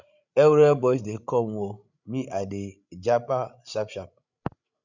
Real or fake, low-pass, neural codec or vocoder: fake; 7.2 kHz; codec, 16 kHz, 16 kbps, FreqCodec, larger model